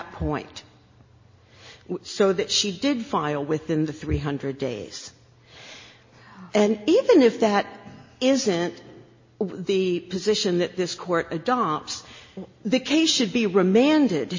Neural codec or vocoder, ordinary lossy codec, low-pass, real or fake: none; MP3, 32 kbps; 7.2 kHz; real